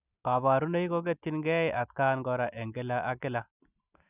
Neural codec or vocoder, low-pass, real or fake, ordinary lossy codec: none; 3.6 kHz; real; none